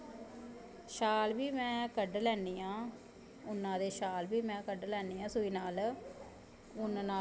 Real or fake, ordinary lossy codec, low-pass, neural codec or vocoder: real; none; none; none